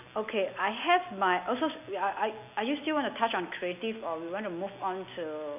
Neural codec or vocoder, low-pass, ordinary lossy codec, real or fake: none; 3.6 kHz; none; real